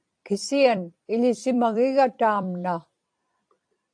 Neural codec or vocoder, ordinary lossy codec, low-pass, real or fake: vocoder, 44.1 kHz, 128 mel bands every 256 samples, BigVGAN v2; AAC, 64 kbps; 9.9 kHz; fake